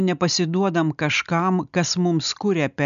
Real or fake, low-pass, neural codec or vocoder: real; 7.2 kHz; none